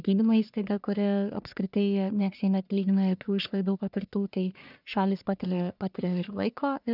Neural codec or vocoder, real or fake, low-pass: codec, 44.1 kHz, 1.7 kbps, Pupu-Codec; fake; 5.4 kHz